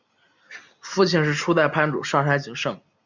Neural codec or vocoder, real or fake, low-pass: none; real; 7.2 kHz